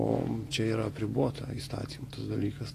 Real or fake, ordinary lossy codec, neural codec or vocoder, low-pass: fake; AAC, 48 kbps; vocoder, 48 kHz, 128 mel bands, Vocos; 14.4 kHz